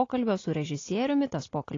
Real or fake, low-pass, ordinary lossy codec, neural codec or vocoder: real; 7.2 kHz; AAC, 32 kbps; none